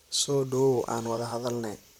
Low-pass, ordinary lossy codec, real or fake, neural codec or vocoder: 19.8 kHz; none; fake; vocoder, 44.1 kHz, 128 mel bands, Pupu-Vocoder